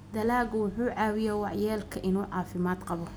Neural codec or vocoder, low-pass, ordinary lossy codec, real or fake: none; none; none; real